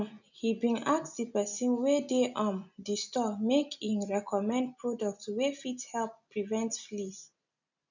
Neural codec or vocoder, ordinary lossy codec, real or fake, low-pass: none; none; real; 7.2 kHz